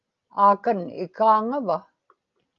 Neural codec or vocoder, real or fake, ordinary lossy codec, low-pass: none; real; Opus, 32 kbps; 7.2 kHz